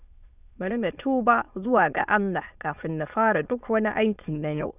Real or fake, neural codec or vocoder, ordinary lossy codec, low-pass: fake; autoencoder, 22.05 kHz, a latent of 192 numbers a frame, VITS, trained on many speakers; none; 3.6 kHz